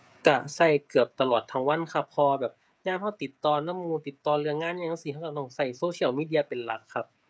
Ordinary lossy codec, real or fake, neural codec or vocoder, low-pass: none; fake; codec, 16 kHz, 16 kbps, FreqCodec, smaller model; none